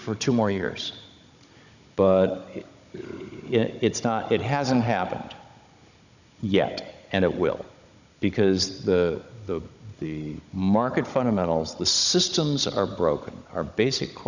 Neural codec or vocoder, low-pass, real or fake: codec, 16 kHz, 16 kbps, FunCodec, trained on Chinese and English, 50 frames a second; 7.2 kHz; fake